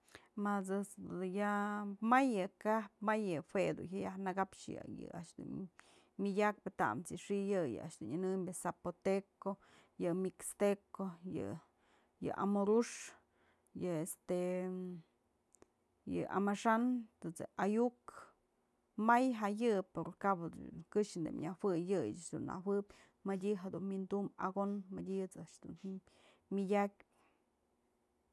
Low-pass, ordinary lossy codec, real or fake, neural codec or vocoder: none; none; real; none